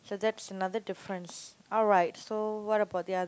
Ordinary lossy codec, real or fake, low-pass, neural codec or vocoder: none; real; none; none